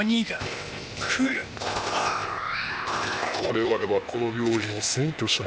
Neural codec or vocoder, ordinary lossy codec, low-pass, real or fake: codec, 16 kHz, 0.8 kbps, ZipCodec; none; none; fake